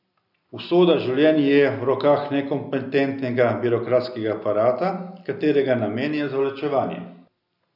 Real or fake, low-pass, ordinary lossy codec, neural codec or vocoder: real; 5.4 kHz; none; none